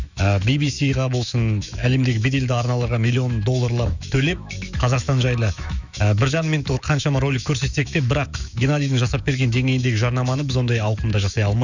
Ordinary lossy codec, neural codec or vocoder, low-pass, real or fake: none; autoencoder, 48 kHz, 128 numbers a frame, DAC-VAE, trained on Japanese speech; 7.2 kHz; fake